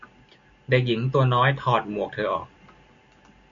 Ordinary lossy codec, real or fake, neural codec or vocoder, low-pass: MP3, 96 kbps; real; none; 7.2 kHz